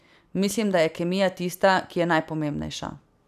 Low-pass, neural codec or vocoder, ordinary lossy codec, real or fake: 14.4 kHz; vocoder, 44.1 kHz, 128 mel bands every 256 samples, BigVGAN v2; none; fake